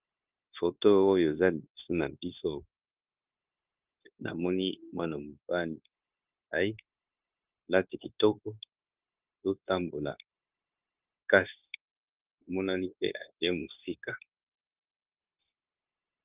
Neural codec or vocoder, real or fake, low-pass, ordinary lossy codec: codec, 16 kHz, 0.9 kbps, LongCat-Audio-Codec; fake; 3.6 kHz; Opus, 32 kbps